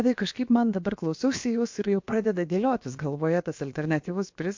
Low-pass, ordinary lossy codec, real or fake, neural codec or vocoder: 7.2 kHz; MP3, 48 kbps; fake; codec, 16 kHz, about 1 kbps, DyCAST, with the encoder's durations